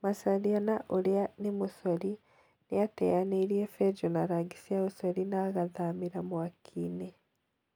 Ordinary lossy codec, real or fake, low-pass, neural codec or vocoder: none; real; none; none